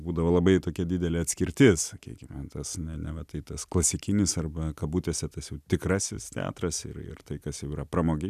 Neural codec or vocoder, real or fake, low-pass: none; real; 14.4 kHz